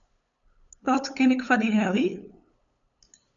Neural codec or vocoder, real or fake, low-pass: codec, 16 kHz, 8 kbps, FunCodec, trained on LibriTTS, 25 frames a second; fake; 7.2 kHz